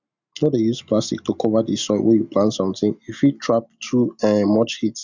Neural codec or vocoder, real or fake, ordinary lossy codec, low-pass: none; real; none; 7.2 kHz